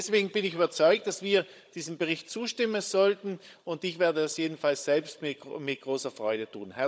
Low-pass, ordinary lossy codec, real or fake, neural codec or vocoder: none; none; fake; codec, 16 kHz, 16 kbps, FunCodec, trained on Chinese and English, 50 frames a second